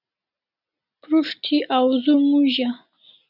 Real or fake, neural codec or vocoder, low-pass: real; none; 5.4 kHz